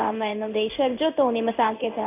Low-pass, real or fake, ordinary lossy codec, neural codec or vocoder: 3.6 kHz; fake; none; codec, 16 kHz in and 24 kHz out, 1 kbps, XY-Tokenizer